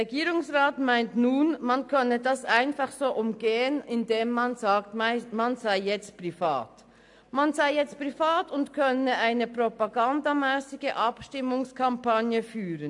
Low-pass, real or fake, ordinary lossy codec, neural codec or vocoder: 10.8 kHz; real; AAC, 64 kbps; none